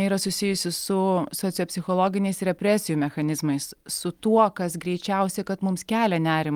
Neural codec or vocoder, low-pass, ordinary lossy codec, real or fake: none; 19.8 kHz; Opus, 32 kbps; real